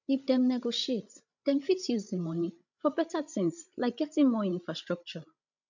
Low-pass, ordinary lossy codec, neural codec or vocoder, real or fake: 7.2 kHz; none; codec, 16 kHz, 16 kbps, FreqCodec, larger model; fake